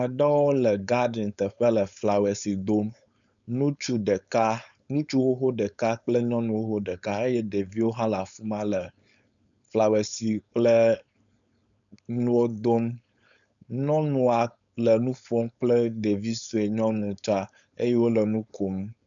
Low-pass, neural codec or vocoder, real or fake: 7.2 kHz; codec, 16 kHz, 4.8 kbps, FACodec; fake